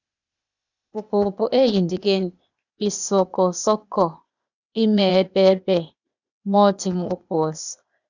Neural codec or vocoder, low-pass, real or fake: codec, 16 kHz, 0.8 kbps, ZipCodec; 7.2 kHz; fake